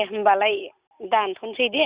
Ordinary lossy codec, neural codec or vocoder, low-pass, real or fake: Opus, 64 kbps; none; 3.6 kHz; real